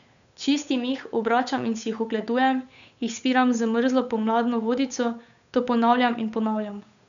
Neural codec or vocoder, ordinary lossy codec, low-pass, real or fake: codec, 16 kHz, 8 kbps, FunCodec, trained on Chinese and English, 25 frames a second; none; 7.2 kHz; fake